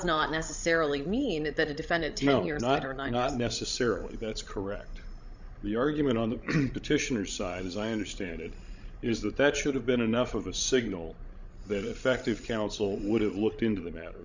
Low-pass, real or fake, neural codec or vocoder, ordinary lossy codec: 7.2 kHz; fake; codec, 16 kHz, 16 kbps, FreqCodec, larger model; Opus, 64 kbps